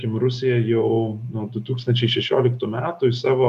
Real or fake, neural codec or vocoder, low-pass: real; none; 14.4 kHz